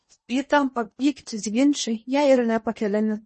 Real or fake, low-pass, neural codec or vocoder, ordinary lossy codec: fake; 10.8 kHz; codec, 16 kHz in and 24 kHz out, 0.8 kbps, FocalCodec, streaming, 65536 codes; MP3, 32 kbps